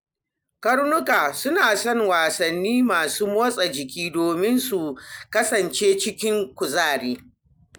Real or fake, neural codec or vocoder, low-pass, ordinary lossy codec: real; none; none; none